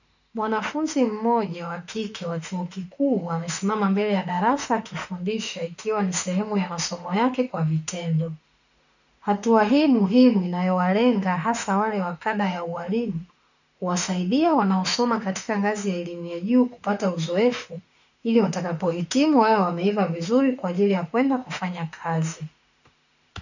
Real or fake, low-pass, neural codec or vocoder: fake; 7.2 kHz; autoencoder, 48 kHz, 32 numbers a frame, DAC-VAE, trained on Japanese speech